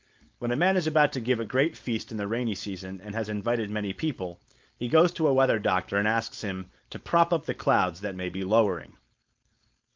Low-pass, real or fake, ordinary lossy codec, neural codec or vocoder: 7.2 kHz; fake; Opus, 24 kbps; codec, 16 kHz, 4.8 kbps, FACodec